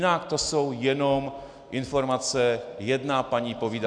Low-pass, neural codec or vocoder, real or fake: 9.9 kHz; none; real